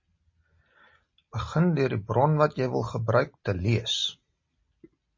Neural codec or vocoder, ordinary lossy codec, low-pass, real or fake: none; MP3, 32 kbps; 7.2 kHz; real